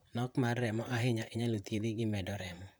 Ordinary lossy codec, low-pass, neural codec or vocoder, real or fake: none; none; vocoder, 44.1 kHz, 128 mel bands, Pupu-Vocoder; fake